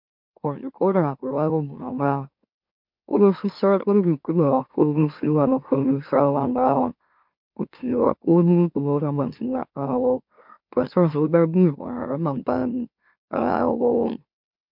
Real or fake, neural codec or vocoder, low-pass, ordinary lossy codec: fake; autoencoder, 44.1 kHz, a latent of 192 numbers a frame, MeloTTS; 5.4 kHz; MP3, 48 kbps